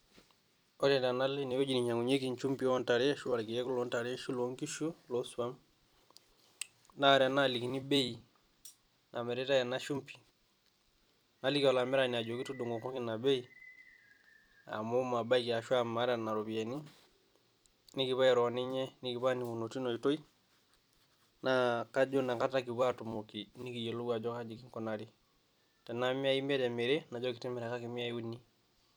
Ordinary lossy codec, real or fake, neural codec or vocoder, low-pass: none; fake; vocoder, 44.1 kHz, 128 mel bands every 256 samples, BigVGAN v2; none